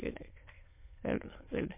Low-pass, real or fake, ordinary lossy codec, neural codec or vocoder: 3.6 kHz; fake; MP3, 24 kbps; autoencoder, 22.05 kHz, a latent of 192 numbers a frame, VITS, trained on many speakers